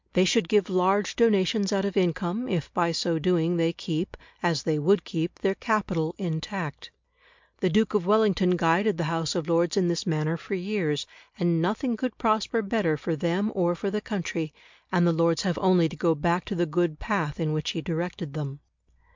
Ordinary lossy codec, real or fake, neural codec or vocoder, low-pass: MP3, 64 kbps; real; none; 7.2 kHz